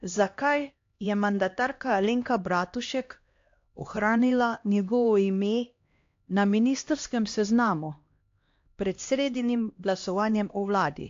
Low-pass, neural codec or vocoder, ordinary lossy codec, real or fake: 7.2 kHz; codec, 16 kHz, 1 kbps, X-Codec, HuBERT features, trained on LibriSpeech; AAC, 48 kbps; fake